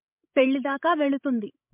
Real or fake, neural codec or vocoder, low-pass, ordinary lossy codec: fake; codec, 16 kHz, 16 kbps, FreqCodec, larger model; 3.6 kHz; MP3, 32 kbps